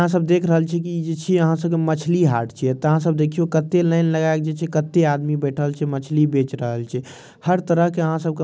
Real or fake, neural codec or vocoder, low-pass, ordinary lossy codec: real; none; none; none